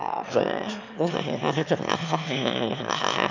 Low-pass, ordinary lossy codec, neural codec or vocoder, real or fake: 7.2 kHz; none; autoencoder, 22.05 kHz, a latent of 192 numbers a frame, VITS, trained on one speaker; fake